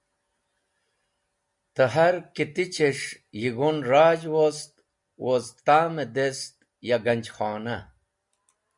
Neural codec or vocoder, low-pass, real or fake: none; 10.8 kHz; real